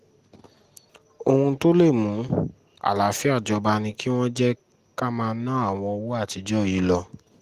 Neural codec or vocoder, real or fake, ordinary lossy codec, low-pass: none; real; Opus, 24 kbps; 14.4 kHz